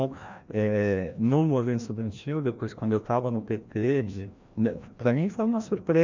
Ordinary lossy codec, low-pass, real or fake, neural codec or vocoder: MP3, 64 kbps; 7.2 kHz; fake; codec, 16 kHz, 1 kbps, FreqCodec, larger model